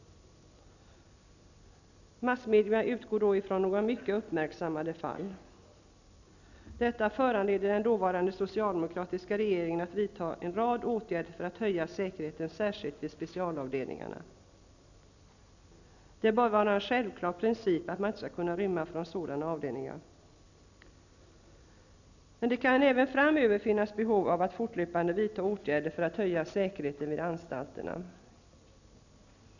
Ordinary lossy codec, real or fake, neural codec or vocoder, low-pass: none; real; none; 7.2 kHz